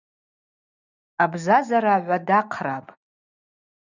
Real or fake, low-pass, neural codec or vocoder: real; 7.2 kHz; none